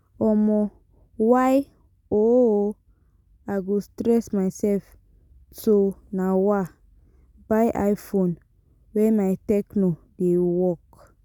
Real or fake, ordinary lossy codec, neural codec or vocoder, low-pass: real; none; none; 19.8 kHz